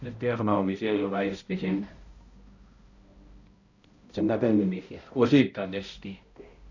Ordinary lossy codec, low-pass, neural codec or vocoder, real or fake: Opus, 64 kbps; 7.2 kHz; codec, 16 kHz, 0.5 kbps, X-Codec, HuBERT features, trained on balanced general audio; fake